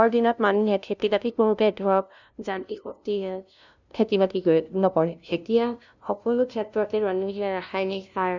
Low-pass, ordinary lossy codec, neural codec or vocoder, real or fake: 7.2 kHz; none; codec, 16 kHz, 0.5 kbps, FunCodec, trained on LibriTTS, 25 frames a second; fake